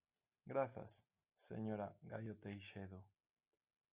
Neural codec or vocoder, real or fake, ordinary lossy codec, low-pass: none; real; Opus, 64 kbps; 3.6 kHz